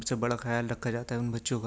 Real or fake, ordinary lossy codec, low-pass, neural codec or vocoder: real; none; none; none